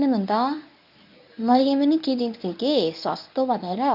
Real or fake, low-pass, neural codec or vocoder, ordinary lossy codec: fake; 5.4 kHz; codec, 24 kHz, 0.9 kbps, WavTokenizer, medium speech release version 1; none